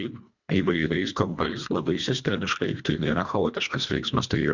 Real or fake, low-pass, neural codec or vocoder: fake; 7.2 kHz; codec, 24 kHz, 1.5 kbps, HILCodec